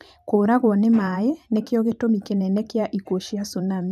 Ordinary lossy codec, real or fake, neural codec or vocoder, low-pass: none; fake; vocoder, 44.1 kHz, 128 mel bands every 512 samples, BigVGAN v2; 14.4 kHz